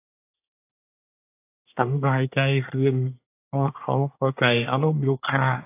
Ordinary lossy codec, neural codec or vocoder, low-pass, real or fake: AAC, 24 kbps; codec, 24 kHz, 1 kbps, SNAC; 3.6 kHz; fake